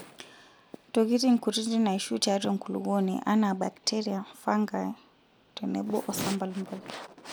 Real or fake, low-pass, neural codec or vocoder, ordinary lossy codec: real; none; none; none